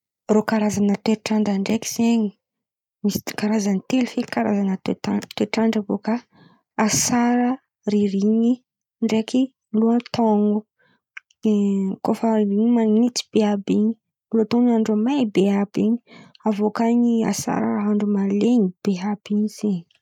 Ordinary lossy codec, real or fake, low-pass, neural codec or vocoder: none; real; 19.8 kHz; none